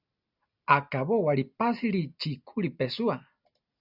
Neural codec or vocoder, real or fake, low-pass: none; real; 5.4 kHz